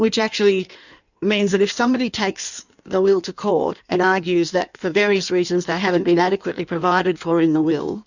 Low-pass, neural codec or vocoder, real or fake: 7.2 kHz; codec, 16 kHz in and 24 kHz out, 1.1 kbps, FireRedTTS-2 codec; fake